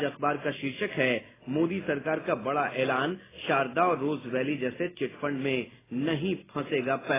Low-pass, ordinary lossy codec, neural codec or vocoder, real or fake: 3.6 kHz; AAC, 16 kbps; none; real